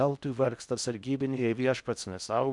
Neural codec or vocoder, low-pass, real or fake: codec, 16 kHz in and 24 kHz out, 0.6 kbps, FocalCodec, streaming, 4096 codes; 10.8 kHz; fake